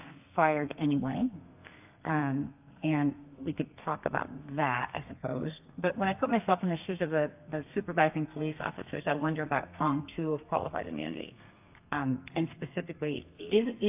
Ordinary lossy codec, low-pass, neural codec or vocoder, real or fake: AAC, 32 kbps; 3.6 kHz; codec, 32 kHz, 1.9 kbps, SNAC; fake